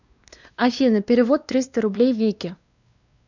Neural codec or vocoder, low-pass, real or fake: codec, 16 kHz, 2 kbps, X-Codec, WavLM features, trained on Multilingual LibriSpeech; 7.2 kHz; fake